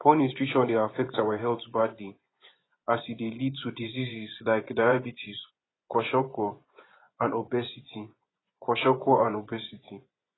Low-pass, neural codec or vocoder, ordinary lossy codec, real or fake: 7.2 kHz; vocoder, 24 kHz, 100 mel bands, Vocos; AAC, 16 kbps; fake